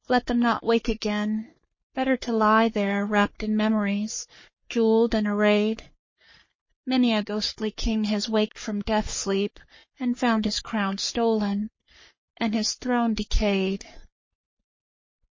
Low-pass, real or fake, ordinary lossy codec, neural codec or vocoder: 7.2 kHz; fake; MP3, 32 kbps; codec, 44.1 kHz, 3.4 kbps, Pupu-Codec